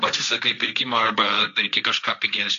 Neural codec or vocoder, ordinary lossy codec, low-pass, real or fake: codec, 16 kHz, 1.1 kbps, Voila-Tokenizer; MP3, 64 kbps; 7.2 kHz; fake